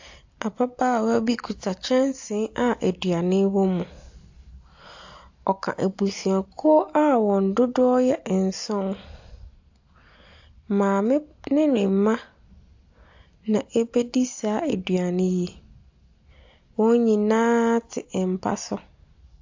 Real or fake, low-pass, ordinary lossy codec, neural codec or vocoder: real; 7.2 kHz; AAC, 48 kbps; none